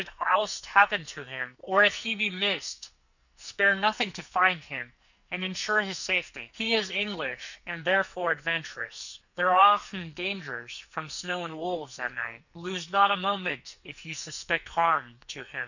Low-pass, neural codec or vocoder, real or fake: 7.2 kHz; codec, 44.1 kHz, 2.6 kbps, SNAC; fake